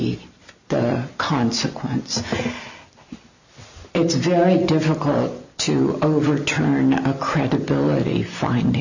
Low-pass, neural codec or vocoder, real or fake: 7.2 kHz; none; real